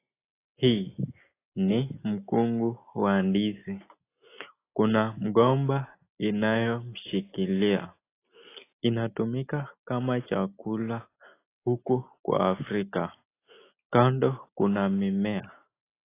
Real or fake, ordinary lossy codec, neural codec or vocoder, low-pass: real; AAC, 24 kbps; none; 3.6 kHz